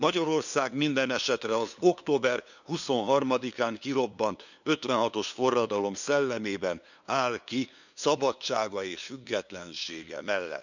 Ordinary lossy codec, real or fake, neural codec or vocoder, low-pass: none; fake; codec, 16 kHz, 2 kbps, FunCodec, trained on LibriTTS, 25 frames a second; 7.2 kHz